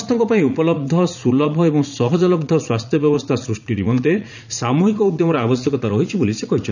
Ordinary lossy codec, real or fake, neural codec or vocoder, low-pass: none; fake; vocoder, 44.1 kHz, 80 mel bands, Vocos; 7.2 kHz